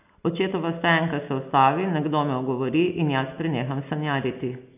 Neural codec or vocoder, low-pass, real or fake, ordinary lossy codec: none; 3.6 kHz; real; none